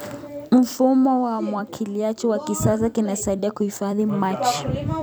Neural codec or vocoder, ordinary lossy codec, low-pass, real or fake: vocoder, 44.1 kHz, 128 mel bands every 256 samples, BigVGAN v2; none; none; fake